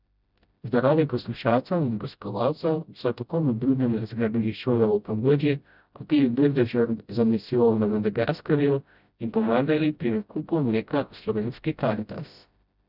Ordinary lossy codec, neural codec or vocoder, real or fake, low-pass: none; codec, 16 kHz, 0.5 kbps, FreqCodec, smaller model; fake; 5.4 kHz